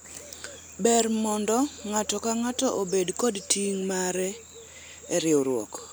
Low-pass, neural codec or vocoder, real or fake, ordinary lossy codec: none; none; real; none